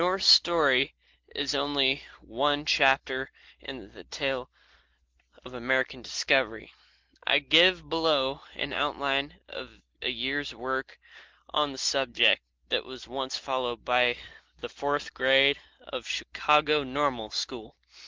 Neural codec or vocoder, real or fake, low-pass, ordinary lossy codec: none; real; 7.2 kHz; Opus, 16 kbps